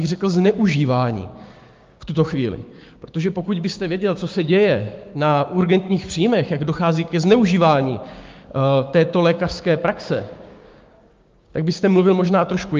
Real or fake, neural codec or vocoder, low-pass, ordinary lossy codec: fake; codec, 16 kHz, 6 kbps, DAC; 7.2 kHz; Opus, 24 kbps